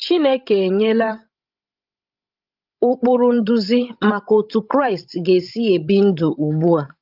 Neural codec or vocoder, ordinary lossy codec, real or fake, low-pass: codec, 16 kHz, 16 kbps, FreqCodec, larger model; Opus, 24 kbps; fake; 5.4 kHz